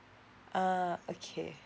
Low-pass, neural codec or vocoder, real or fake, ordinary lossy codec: none; none; real; none